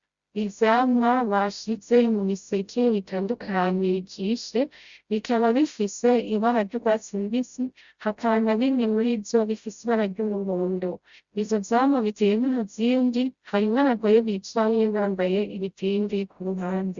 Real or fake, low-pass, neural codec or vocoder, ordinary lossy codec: fake; 7.2 kHz; codec, 16 kHz, 0.5 kbps, FreqCodec, smaller model; Opus, 64 kbps